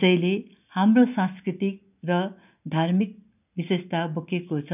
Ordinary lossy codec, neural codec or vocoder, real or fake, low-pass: none; none; real; 3.6 kHz